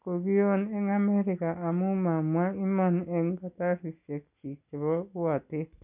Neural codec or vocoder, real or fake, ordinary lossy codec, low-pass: none; real; none; 3.6 kHz